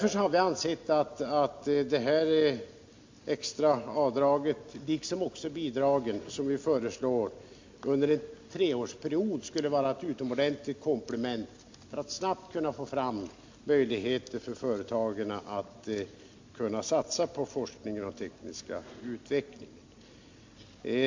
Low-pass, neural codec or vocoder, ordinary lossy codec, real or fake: 7.2 kHz; none; MP3, 48 kbps; real